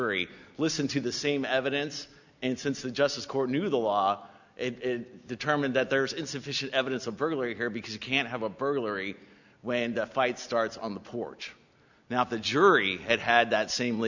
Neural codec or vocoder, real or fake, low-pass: none; real; 7.2 kHz